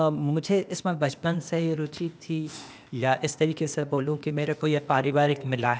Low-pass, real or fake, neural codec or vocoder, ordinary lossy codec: none; fake; codec, 16 kHz, 0.8 kbps, ZipCodec; none